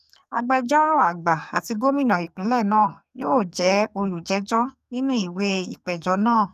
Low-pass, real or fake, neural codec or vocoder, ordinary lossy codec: 14.4 kHz; fake; codec, 44.1 kHz, 2.6 kbps, SNAC; none